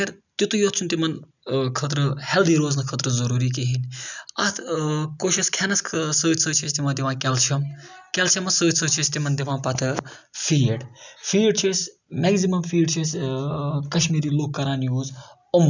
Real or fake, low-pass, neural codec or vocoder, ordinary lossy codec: real; 7.2 kHz; none; none